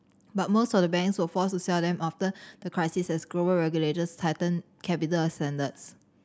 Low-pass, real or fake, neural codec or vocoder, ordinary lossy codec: none; real; none; none